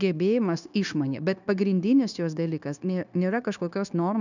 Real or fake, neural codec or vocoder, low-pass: fake; codec, 16 kHz, 0.9 kbps, LongCat-Audio-Codec; 7.2 kHz